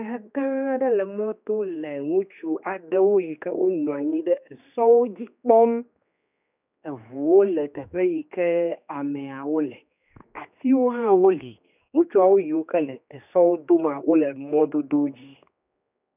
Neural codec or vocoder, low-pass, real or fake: codec, 16 kHz, 2 kbps, X-Codec, HuBERT features, trained on general audio; 3.6 kHz; fake